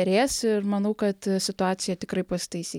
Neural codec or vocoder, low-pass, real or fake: none; 19.8 kHz; real